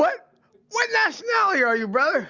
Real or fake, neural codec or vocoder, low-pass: real; none; 7.2 kHz